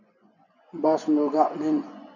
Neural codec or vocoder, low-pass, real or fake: vocoder, 24 kHz, 100 mel bands, Vocos; 7.2 kHz; fake